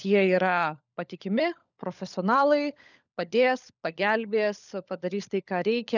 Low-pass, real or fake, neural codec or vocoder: 7.2 kHz; fake; codec, 16 kHz, 16 kbps, FunCodec, trained on LibriTTS, 50 frames a second